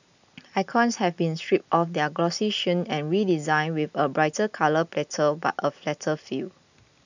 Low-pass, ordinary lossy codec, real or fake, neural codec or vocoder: 7.2 kHz; none; real; none